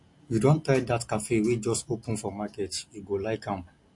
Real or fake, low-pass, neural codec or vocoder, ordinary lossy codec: real; 10.8 kHz; none; MP3, 48 kbps